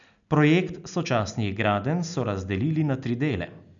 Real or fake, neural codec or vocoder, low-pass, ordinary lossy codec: real; none; 7.2 kHz; MP3, 96 kbps